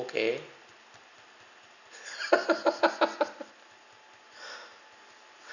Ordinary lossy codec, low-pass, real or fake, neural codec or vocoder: none; 7.2 kHz; real; none